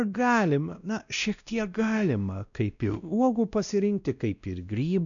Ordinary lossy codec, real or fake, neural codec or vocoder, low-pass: MP3, 96 kbps; fake; codec, 16 kHz, 1 kbps, X-Codec, WavLM features, trained on Multilingual LibriSpeech; 7.2 kHz